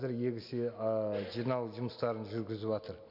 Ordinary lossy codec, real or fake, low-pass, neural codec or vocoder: none; real; 5.4 kHz; none